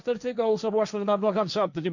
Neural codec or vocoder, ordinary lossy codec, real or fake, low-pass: codec, 16 kHz, 1.1 kbps, Voila-Tokenizer; none; fake; 7.2 kHz